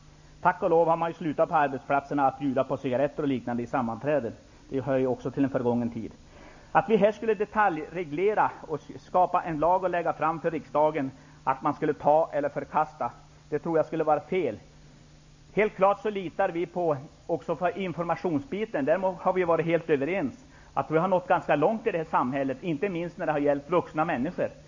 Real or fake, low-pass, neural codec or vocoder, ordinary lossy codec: real; 7.2 kHz; none; AAC, 48 kbps